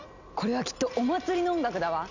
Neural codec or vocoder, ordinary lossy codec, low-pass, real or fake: none; none; 7.2 kHz; real